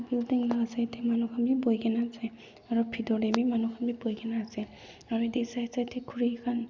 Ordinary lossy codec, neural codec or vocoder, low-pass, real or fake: Opus, 64 kbps; none; 7.2 kHz; real